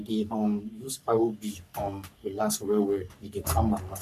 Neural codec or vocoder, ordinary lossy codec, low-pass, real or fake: codec, 44.1 kHz, 3.4 kbps, Pupu-Codec; none; 14.4 kHz; fake